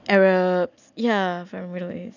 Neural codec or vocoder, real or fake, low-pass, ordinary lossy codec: none; real; 7.2 kHz; none